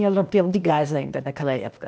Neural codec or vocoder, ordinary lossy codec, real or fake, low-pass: codec, 16 kHz, 0.8 kbps, ZipCodec; none; fake; none